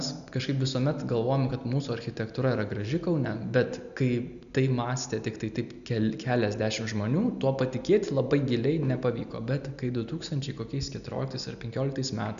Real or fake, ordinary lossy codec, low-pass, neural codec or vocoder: real; MP3, 96 kbps; 7.2 kHz; none